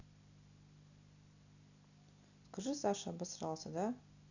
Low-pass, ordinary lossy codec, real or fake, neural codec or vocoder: 7.2 kHz; none; real; none